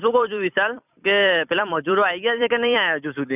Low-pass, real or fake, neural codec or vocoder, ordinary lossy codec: 3.6 kHz; fake; vocoder, 44.1 kHz, 128 mel bands every 256 samples, BigVGAN v2; none